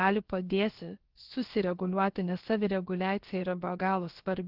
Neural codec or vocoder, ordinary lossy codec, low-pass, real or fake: codec, 16 kHz, about 1 kbps, DyCAST, with the encoder's durations; Opus, 16 kbps; 5.4 kHz; fake